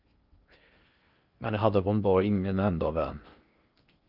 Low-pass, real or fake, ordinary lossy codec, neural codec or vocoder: 5.4 kHz; fake; Opus, 24 kbps; codec, 16 kHz in and 24 kHz out, 0.6 kbps, FocalCodec, streaming, 2048 codes